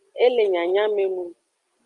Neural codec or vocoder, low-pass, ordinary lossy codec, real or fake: none; 10.8 kHz; Opus, 32 kbps; real